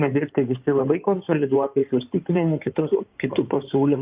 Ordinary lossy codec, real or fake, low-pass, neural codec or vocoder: Opus, 24 kbps; fake; 3.6 kHz; codec, 16 kHz in and 24 kHz out, 2.2 kbps, FireRedTTS-2 codec